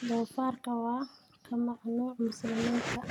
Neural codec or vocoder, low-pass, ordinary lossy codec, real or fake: none; 19.8 kHz; none; real